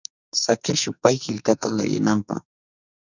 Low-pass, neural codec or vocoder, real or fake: 7.2 kHz; codec, 44.1 kHz, 2.6 kbps, SNAC; fake